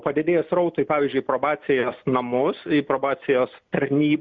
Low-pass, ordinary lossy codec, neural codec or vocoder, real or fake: 7.2 kHz; Opus, 64 kbps; none; real